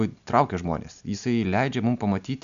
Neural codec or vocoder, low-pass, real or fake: none; 7.2 kHz; real